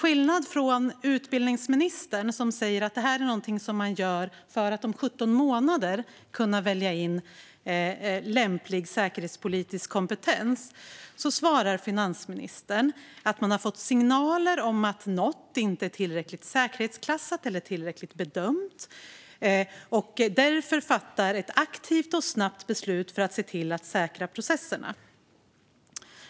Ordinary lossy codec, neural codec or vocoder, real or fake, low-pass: none; none; real; none